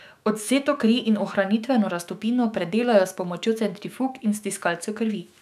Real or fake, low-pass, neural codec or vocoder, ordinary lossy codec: fake; 14.4 kHz; autoencoder, 48 kHz, 128 numbers a frame, DAC-VAE, trained on Japanese speech; none